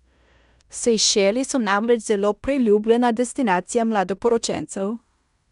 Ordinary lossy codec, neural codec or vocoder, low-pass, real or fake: none; codec, 16 kHz in and 24 kHz out, 0.9 kbps, LongCat-Audio-Codec, fine tuned four codebook decoder; 10.8 kHz; fake